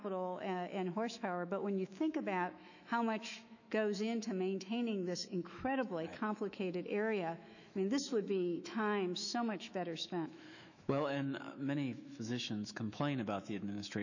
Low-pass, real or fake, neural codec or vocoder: 7.2 kHz; fake; autoencoder, 48 kHz, 128 numbers a frame, DAC-VAE, trained on Japanese speech